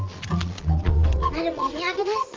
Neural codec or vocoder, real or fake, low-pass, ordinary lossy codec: vocoder, 22.05 kHz, 80 mel bands, Vocos; fake; 7.2 kHz; Opus, 32 kbps